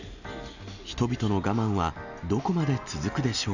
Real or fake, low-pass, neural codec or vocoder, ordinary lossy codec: real; 7.2 kHz; none; none